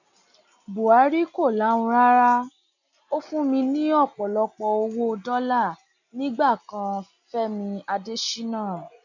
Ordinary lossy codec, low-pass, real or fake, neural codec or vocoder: none; 7.2 kHz; real; none